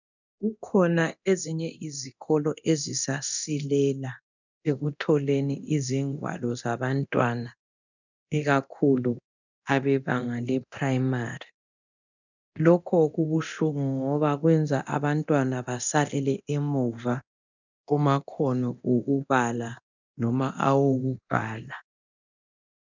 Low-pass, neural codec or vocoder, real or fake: 7.2 kHz; codec, 24 kHz, 0.9 kbps, DualCodec; fake